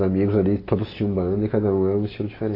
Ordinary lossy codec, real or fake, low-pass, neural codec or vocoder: AAC, 24 kbps; real; 5.4 kHz; none